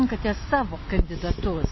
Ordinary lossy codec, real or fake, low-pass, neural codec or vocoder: MP3, 24 kbps; real; 7.2 kHz; none